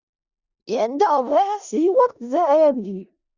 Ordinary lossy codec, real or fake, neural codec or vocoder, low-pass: Opus, 64 kbps; fake; codec, 16 kHz in and 24 kHz out, 0.4 kbps, LongCat-Audio-Codec, four codebook decoder; 7.2 kHz